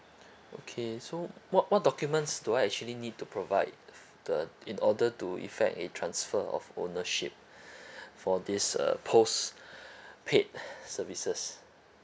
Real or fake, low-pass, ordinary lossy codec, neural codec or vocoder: real; none; none; none